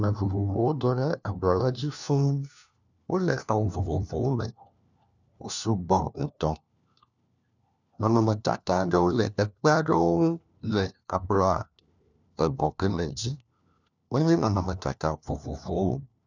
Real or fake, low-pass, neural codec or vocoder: fake; 7.2 kHz; codec, 16 kHz, 1 kbps, FunCodec, trained on LibriTTS, 50 frames a second